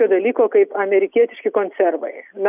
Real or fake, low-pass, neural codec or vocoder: real; 3.6 kHz; none